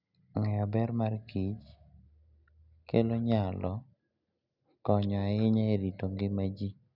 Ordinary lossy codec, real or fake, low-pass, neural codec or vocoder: none; real; 5.4 kHz; none